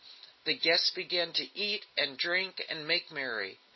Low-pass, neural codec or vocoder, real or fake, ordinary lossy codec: 7.2 kHz; none; real; MP3, 24 kbps